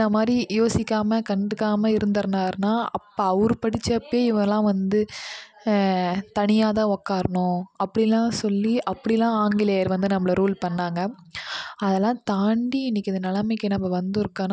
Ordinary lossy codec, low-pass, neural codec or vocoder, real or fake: none; none; none; real